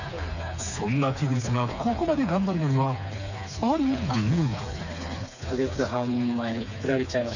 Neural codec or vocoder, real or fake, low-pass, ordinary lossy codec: codec, 16 kHz, 4 kbps, FreqCodec, smaller model; fake; 7.2 kHz; none